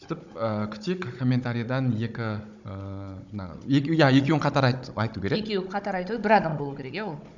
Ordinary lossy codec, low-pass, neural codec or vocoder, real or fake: none; 7.2 kHz; codec, 16 kHz, 16 kbps, FunCodec, trained on Chinese and English, 50 frames a second; fake